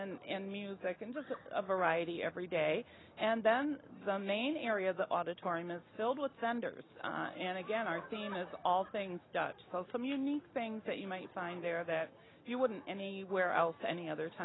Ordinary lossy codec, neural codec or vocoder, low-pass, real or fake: AAC, 16 kbps; none; 7.2 kHz; real